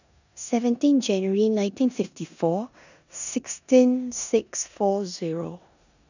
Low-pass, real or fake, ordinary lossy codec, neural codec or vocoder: 7.2 kHz; fake; none; codec, 16 kHz in and 24 kHz out, 0.9 kbps, LongCat-Audio-Codec, four codebook decoder